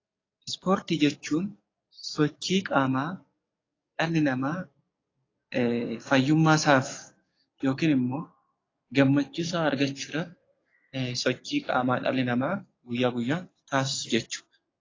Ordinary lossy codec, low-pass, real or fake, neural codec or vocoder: AAC, 32 kbps; 7.2 kHz; fake; codec, 44.1 kHz, 7.8 kbps, DAC